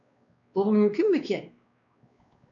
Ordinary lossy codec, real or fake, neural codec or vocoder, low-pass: AAC, 64 kbps; fake; codec, 16 kHz, 2 kbps, X-Codec, WavLM features, trained on Multilingual LibriSpeech; 7.2 kHz